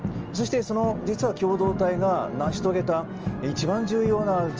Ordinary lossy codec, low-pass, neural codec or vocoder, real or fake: Opus, 24 kbps; 7.2 kHz; none; real